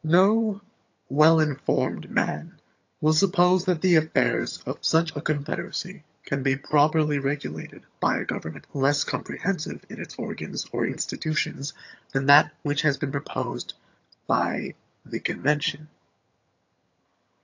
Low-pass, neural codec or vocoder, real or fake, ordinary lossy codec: 7.2 kHz; vocoder, 22.05 kHz, 80 mel bands, HiFi-GAN; fake; AAC, 48 kbps